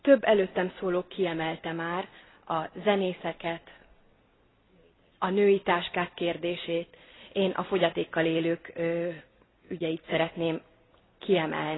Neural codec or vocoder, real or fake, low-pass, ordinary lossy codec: none; real; 7.2 kHz; AAC, 16 kbps